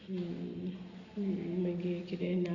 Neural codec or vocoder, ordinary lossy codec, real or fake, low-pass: none; Opus, 64 kbps; real; 7.2 kHz